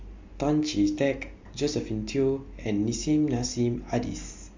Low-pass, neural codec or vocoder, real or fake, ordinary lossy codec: 7.2 kHz; none; real; MP3, 48 kbps